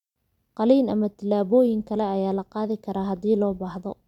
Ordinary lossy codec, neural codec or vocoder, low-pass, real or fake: none; none; 19.8 kHz; real